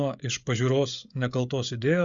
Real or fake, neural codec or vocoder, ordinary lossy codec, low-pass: fake; codec, 16 kHz, 16 kbps, FreqCodec, smaller model; Opus, 64 kbps; 7.2 kHz